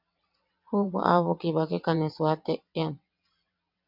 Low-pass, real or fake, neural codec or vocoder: 5.4 kHz; fake; vocoder, 22.05 kHz, 80 mel bands, WaveNeXt